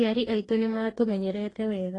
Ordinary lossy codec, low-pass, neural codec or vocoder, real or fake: AAC, 32 kbps; 10.8 kHz; codec, 44.1 kHz, 2.6 kbps, DAC; fake